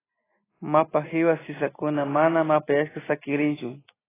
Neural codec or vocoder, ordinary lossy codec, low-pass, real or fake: none; AAC, 16 kbps; 3.6 kHz; real